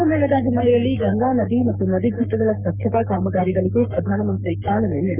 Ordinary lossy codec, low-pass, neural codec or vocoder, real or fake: none; 3.6 kHz; codec, 44.1 kHz, 3.4 kbps, Pupu-Codec; fake